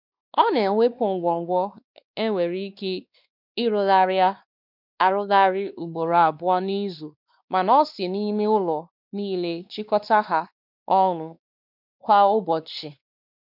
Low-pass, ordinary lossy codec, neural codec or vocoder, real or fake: 5.4 kHz; none; codec, 16 kHz, 2 kbps, X-Codec, WavLM features, trained on Multilingual LibriSpeech; fake